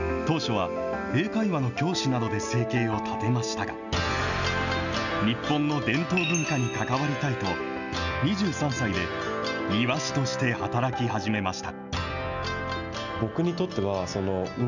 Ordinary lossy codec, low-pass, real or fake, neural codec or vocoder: none; 7.2 kHz; real; none